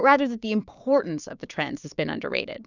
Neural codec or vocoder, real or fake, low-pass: codec, 16 kHz, 6 kbps, DAC; fake; 7.2 kHz